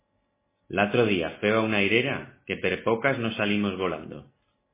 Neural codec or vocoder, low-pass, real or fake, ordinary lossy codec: none; 3.6 kHz; real; MP3, 16 kbps